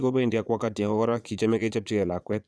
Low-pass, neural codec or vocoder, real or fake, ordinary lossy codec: none; vocoder, 22.05 kHz, 80 mel bands, Vocos; fake; none